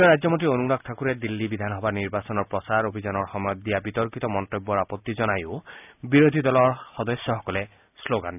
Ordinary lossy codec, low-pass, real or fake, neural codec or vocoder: none; 3.6 kHz; real; none